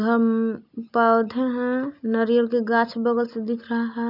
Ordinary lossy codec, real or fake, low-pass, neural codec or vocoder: none; real; 5.4 kHz; none